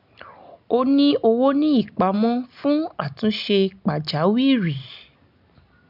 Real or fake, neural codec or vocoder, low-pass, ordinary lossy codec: real; none; 5.4 kHz; none